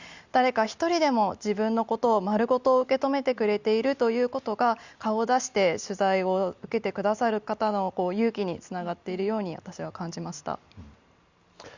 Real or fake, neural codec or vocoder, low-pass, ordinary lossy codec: real; none; 7.2 kHz; Opus, 64 kbps